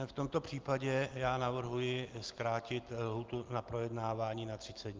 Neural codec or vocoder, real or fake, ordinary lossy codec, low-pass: none; real; Opus, 32 kbps; 7.2 kHz